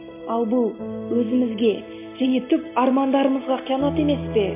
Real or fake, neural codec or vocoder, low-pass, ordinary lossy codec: real; none; 3.6 kHz; MP3, 24 kbps